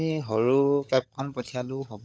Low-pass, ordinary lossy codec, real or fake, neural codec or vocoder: none; none; fake; codec, 16 kHz, 16 kbps, FunCodec, trained on LibriTTS, 50 frames a second